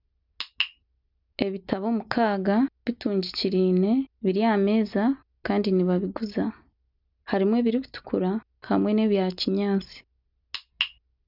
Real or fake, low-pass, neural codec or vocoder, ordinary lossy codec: real; 5.4 kHz; none; none